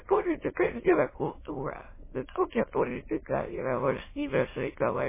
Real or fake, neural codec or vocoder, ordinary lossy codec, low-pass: fake; autoencoder, 22.05 kHz, a latent of 192 numbers a frame, VITS, trained on many speakers; MP3, 16 kbps; 3.6 kHz